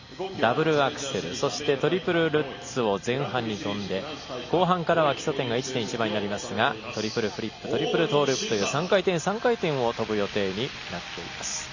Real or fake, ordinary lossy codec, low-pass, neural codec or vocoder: real; none; 7.2 kHz; none